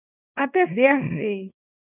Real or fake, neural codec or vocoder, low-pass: fake; codec, 24 kHz, 0.9 kbps, WavTokenizer, small release; 3.6 kHz